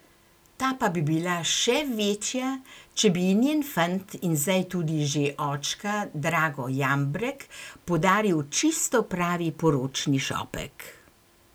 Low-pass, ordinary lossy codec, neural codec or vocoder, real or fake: none; none; none; real